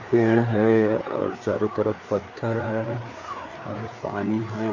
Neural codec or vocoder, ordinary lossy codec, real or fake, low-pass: codec, 16 kHz, 4 kbps, FreqCodec, larger model; none; fake; 7.2 kHz